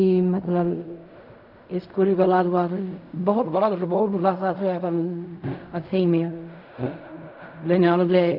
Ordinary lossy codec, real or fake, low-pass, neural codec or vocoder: none; fake; 5.4 kHz; codec, 16 kHz in and 24 kHz out, 0.4 kbps, LongCat-Audio-Codec, fine tuned four codebook decoder